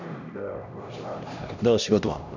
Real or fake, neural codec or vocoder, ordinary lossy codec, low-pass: fake; codec, 16 kHz, 1 kbps, X-Codec, HuBERT features, trained on LibriSpeech; none; 7.2 kHz